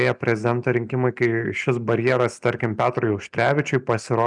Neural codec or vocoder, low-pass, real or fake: none; 10.8 kHz; real